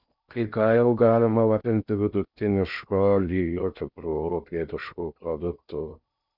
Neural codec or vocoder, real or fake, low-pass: codec, 16 kHz in and 24 kHz out, 0.6 kbps, FocalCodec, streaming, 2048 codes; fake; 5.4 kHz